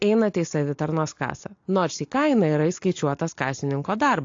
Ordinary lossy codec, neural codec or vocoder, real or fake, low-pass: AAC, 48 kbps; none; real; 7.2 kHz